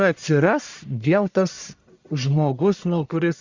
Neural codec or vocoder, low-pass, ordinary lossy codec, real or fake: codec, 44.1 kHz, 1.7 kbps, Pupu-Codec; 7.2 kHz; Opus, 64 kbps; fake